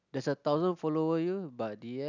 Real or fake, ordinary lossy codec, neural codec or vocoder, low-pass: real; none; none; 7.2 kHz